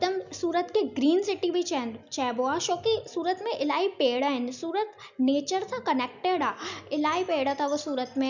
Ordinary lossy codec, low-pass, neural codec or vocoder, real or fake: none; 7.2 kHz; none; real